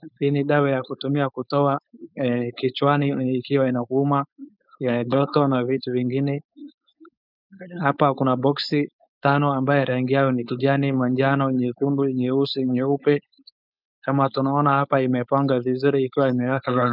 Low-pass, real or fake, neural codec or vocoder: 5.4 kHz; fake; codec, 16 kHz, 4.8 kbps, FACodec